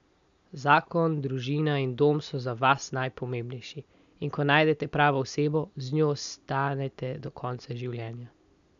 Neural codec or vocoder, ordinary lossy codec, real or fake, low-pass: none; none; real; 7.2 kHz